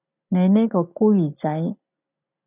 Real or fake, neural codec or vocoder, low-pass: real; none; 3.6 kHz